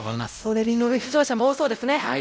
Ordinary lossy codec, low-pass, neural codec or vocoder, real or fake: none; none; codec, 16 kHz, 0.5 kbps, X-Codec, WavLM features, trained on Multilingual LibriSpeech; fake